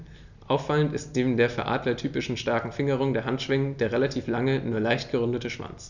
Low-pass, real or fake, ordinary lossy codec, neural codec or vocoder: 7.2 kHz; real; none; none